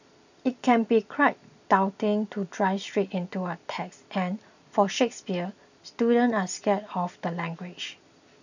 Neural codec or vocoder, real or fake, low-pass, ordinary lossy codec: none; real; 7.2 kHz; none